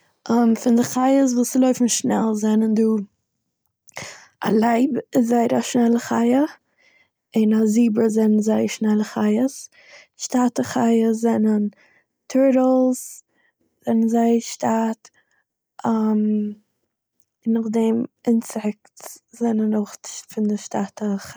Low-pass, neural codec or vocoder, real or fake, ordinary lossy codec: none; none; real; none